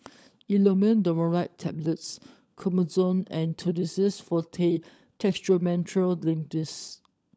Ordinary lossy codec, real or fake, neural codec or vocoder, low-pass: none; fake; codec, 16 kHz, 16 kbps, FunCodec, trained on LibriTTS, 50 frames a second; none